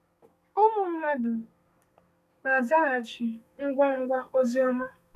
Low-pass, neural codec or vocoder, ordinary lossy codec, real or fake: 14.4 kHz; codec, 32 kHz, 1.9 kbps, SNAC; none; fake